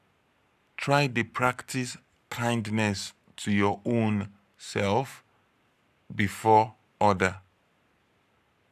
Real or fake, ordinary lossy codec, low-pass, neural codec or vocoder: fake; none; 14.4 kHz; codec, 44.1 kHz, 7.8 kbps, Pupu-Codec